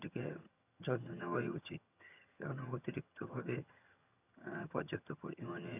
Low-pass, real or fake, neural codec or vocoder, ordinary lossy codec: 3.6 kHz; fake; vocoder, 22.05 kHz, 80 mel bands, HiFi-GAN; none